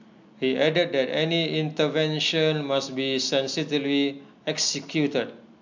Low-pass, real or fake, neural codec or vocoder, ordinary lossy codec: 7.2 kHz; real; none; MP3, 64 kbps